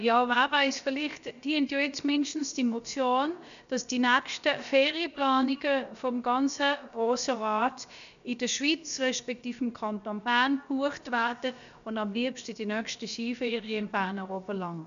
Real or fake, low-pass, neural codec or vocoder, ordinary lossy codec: fake; 7.2 kHz; codec, 16 kHz, about 1 kbps, DyCAST, with the encoder's durations; none